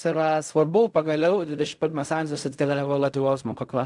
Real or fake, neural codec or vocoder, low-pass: fake; codec, 16 kHz in and 24 kHz out, 0.4 kbps, LongCat-Audio-Codec, fine tuned four codebook decoder; 10.8 kHz